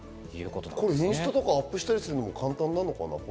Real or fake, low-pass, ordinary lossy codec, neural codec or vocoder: real; none; none; none